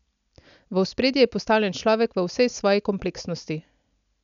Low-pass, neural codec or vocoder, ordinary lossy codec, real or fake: 7.2 kHz; none; none; real